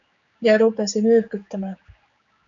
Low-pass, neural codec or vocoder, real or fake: 7.2 kHz; codec, 16 kHz, 4 kbps, X-Codec, HuBERT features, trained on general audio; fake